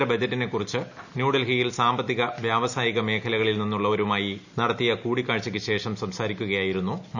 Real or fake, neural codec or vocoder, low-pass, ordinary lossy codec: real; none; 7.2 kHz; none